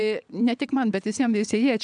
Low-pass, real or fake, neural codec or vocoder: 9.9 kHz; fake; vocoder, 22.05 kHz, 80 mel bands, WaveNeXt